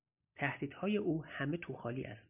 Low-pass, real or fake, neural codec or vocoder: 3.6 kHz; real; none